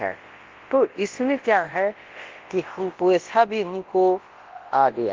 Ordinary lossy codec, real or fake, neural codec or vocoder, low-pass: Opus, 16 kbps; fake; codec, 24 kHz, 0.9 kbps, WavTokenizer, large speech release; 7.2 kHz